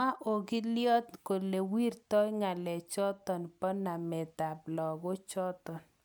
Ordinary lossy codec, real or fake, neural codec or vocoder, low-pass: none; real; none; none